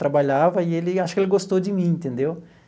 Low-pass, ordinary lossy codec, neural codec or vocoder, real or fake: none; none; none; real